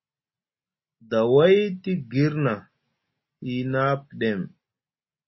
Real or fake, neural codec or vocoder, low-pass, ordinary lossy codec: real; none; 7.2 kHz; MP3, 24 kbps